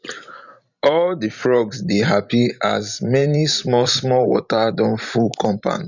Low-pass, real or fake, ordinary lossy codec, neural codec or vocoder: 7.2 kHz; fake; none; vocoder, 44.1 kHz, 128 mel bands every 512 samples, BigVGAN v2